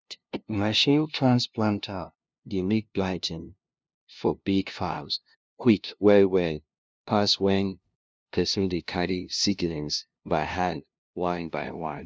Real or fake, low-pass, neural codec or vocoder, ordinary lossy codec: fake; none; codec, 16 kHz, 0.5 kbps, FunCodec, trained on LibriTTS, 25 frames a second; none